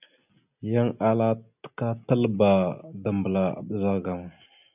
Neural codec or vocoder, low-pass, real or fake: none; 3.6 kHz; real